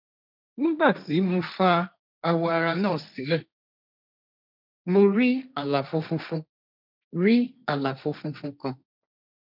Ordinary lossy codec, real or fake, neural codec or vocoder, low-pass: none; fake; codec, 16 kHz, 1.1 kbps, Voila-Tokenizer; 5.4 kHz